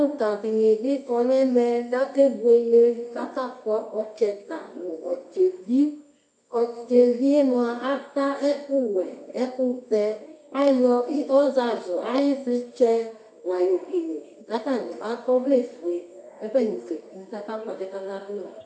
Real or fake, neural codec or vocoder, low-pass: fake; codec, 24 kHz, 0.9 kbps, WavTokenizer, medium music audio release; 9.9 kHz